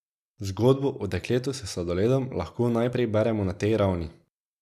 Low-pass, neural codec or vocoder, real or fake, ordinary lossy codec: 14.4 kHz; none; real; none